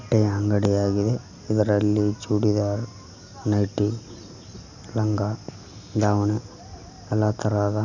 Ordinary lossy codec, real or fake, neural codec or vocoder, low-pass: none; real; none; 7.2 kHz